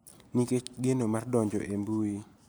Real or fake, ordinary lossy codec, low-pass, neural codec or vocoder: real; none; none; none